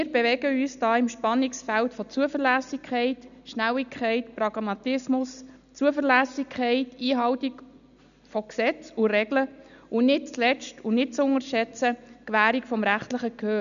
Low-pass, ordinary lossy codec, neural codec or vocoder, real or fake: 7.2 kHz; none; none; real